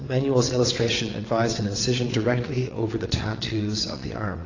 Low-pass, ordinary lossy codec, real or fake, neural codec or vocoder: 7.2 kHz; AAC, 32 kbps; fake; vocoder, 22.05 kHz, 80 mel bands, WaveNeXt